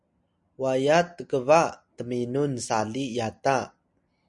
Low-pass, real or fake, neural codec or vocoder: 10.8 kHz; real; none